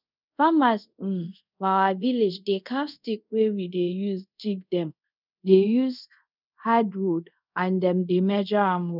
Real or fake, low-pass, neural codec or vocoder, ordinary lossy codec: fake; 5.4 kHz; codec, 24 kHz, 0.5 kbps, DualCodec; none